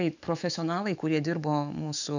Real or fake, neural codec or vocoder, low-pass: fake; autoencoder, 48 kHz, 128 numbers a frame, DAC-VAE, trained on Japanese speech; 7.2 kHz